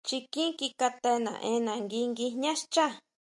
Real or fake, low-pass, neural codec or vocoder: real; 10.8 kHz; none